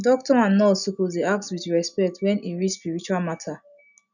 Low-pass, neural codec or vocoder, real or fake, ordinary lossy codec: 7.2 kHz; none; real; none